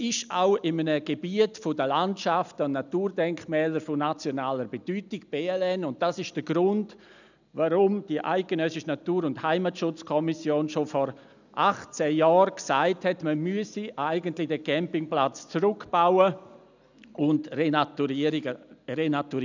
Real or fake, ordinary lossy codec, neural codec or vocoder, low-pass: real; none; none; 7.2 kHz